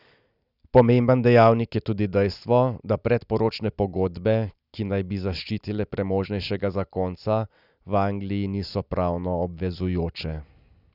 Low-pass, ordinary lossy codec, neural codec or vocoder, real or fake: 5.4 kHz; none; none; real